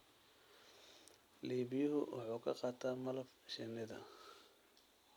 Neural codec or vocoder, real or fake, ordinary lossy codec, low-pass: none; real; none; none